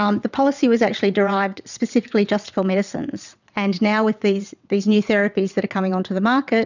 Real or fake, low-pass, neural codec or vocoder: fake; 7.2 kHz; vocoder, 22.05 kHz, 80 mel bands, WaveNeXt